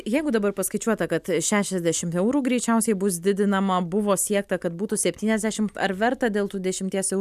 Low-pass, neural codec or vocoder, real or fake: 14.4 kHz; none; real